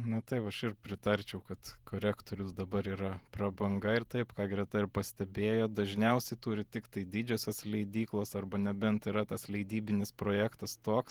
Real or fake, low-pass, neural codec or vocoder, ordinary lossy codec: fake; 19.8 kHz; vocoder, 48 kHz, 128 mel bands, Vocos; Opus, 24 kbps